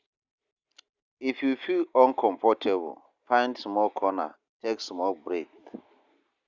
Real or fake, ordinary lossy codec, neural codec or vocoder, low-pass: real; Opus, 64 kbps; none; 7.2 kHz